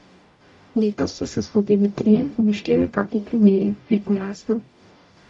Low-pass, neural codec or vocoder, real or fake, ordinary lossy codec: 10.8 kHz; codec, 44.1 kHz, 0.9 kbps, DAC; fake; none